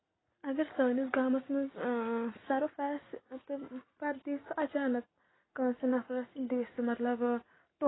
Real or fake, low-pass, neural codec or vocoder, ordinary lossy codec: real; 7.2 kHz; none; AAC, 16 kbps